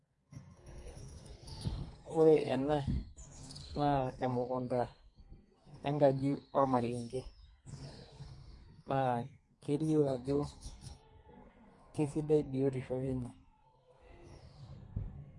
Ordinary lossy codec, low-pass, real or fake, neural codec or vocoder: MP3, 48 kbps; 10.8 kHz; fake; codec, 32 kHz, 1.9 kbps, SNAC